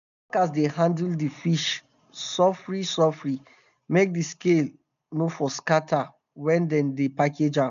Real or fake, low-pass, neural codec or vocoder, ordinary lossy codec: real; 7.2 kHz; none; none